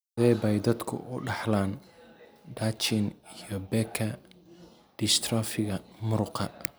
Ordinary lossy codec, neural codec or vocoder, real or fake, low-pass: none; none; real; none